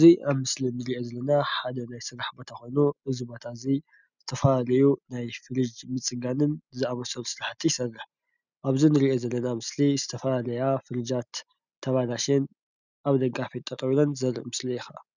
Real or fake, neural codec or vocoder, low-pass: real; none; 7.2 kHz